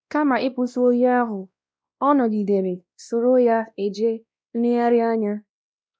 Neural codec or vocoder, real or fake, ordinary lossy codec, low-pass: codec, 16 kHz, 1 kbps, X-Codec, WavLM features, trained on Multilingual LibriSpeech; fake; none; none